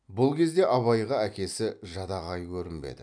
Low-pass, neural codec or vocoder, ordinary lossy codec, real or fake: 9.9 kHz; none; none; real